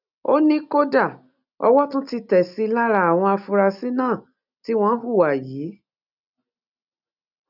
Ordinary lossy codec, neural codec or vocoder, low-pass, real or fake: none; none; 5.4 kHz; real